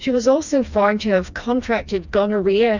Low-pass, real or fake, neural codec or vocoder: 7.2 kHz; fake; codec, 16 kHz, 2 kbps, FreqCodec, smaller model